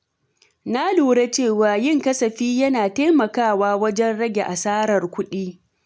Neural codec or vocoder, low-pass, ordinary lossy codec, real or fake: none; none; none; real